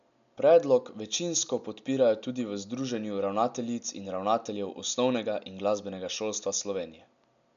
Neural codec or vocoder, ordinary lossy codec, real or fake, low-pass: none; none; real; 7.2 kHz